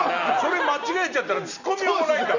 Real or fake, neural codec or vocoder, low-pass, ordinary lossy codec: real; none; 7.2 kHz; none